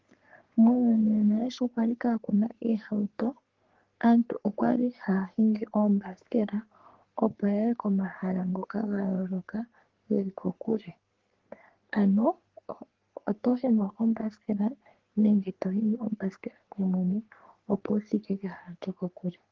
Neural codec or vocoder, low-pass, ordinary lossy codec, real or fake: codec, 44.1 kHz, 3.4 kbps, Pupu-Codec; 7.2 kHz; Opus, 16 kbps; fake